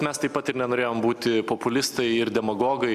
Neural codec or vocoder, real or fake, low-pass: none; real; 14.4 kHz